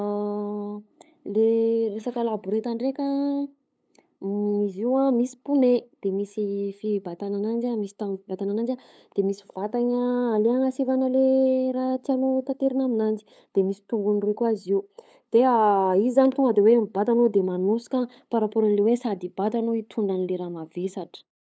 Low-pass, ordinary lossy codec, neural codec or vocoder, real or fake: none; none; codec, 16 kHz, 8 kbps, FunCodec, trained on LibriTTS, 25 frames a second; fake